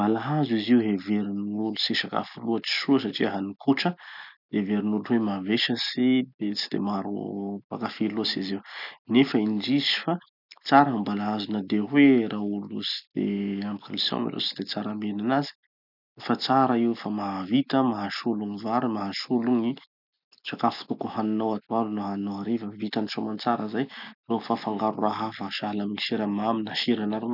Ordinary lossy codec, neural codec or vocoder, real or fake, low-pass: none; none; real; 5.4 kHz